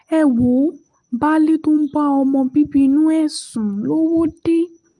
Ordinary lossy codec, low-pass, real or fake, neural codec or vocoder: Opus, 24 kbps; 10.8 kHz; real; none